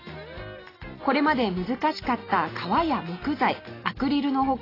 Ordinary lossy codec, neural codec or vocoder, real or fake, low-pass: AAC, 24 kbps; none; real; 5.4 kHz